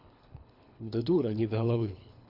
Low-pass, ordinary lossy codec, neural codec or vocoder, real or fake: 5.4 kHz; none; codec, 24 kHz, 3 kbps, HILCodec; fake